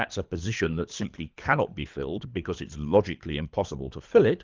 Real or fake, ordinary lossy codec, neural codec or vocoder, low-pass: fake; Opus, 24 kbps; codec, 24 kHz, 3 kbps, HILCodec; 7.2 kHz